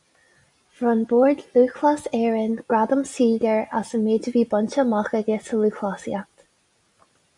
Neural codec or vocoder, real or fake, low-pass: none; real; 10.8 kHz